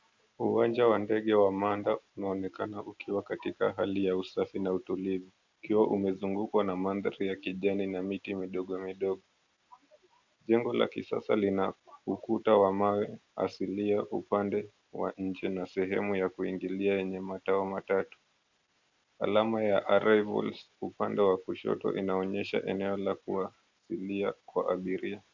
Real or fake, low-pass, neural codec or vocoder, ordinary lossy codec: real; 7.2 kHz; none; MP3, 64 kbps